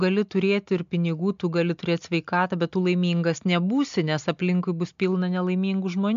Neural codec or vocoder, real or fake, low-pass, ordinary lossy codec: none; real; 7.2 kHz; MP3, 64 kbps